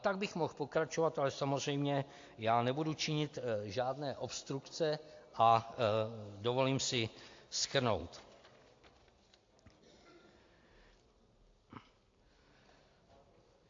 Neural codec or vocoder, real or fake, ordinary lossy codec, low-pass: none; real; AAC, 48 kbps; 7.2 kHz